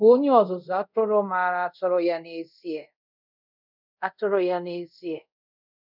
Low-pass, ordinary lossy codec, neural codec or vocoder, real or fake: 5.4 kHz; none; codec, 24 kHz, 0.5 kbps, DualCodec; fake